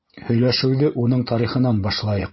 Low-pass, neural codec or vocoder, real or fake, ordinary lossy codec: 7.2 kHz; none; real; MP3, 24 kbps